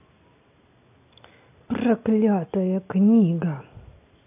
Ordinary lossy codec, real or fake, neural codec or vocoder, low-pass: AAC, 24 kbps; real; none; 3.6 kHz